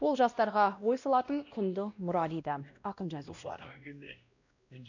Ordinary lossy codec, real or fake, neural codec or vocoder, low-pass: none; fake; codec, 16 kHz, 1 kbps, X-Codec, WavLM features, trained on Multilingual LibriSpeech; 7.2 kHz